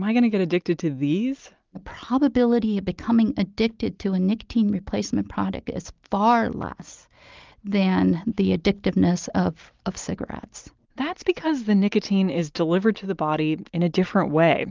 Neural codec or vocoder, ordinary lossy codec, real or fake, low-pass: none; Opus, 32 kbps; real; 7.2 kHz